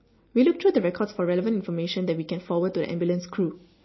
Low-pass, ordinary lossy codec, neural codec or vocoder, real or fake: 7.2 kHz; MP3, 24 kbps; none; real